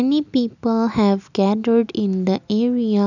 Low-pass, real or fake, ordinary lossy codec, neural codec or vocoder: 7.2 kHz; real; none; none